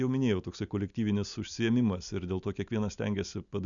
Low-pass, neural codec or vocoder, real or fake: 7.2 kHz; none; real